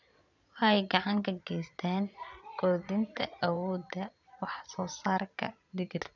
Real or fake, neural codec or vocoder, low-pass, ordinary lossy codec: fake; vocoder, 22.05 kHz, 80 mel bands, Vocos; 7.2 kHz; none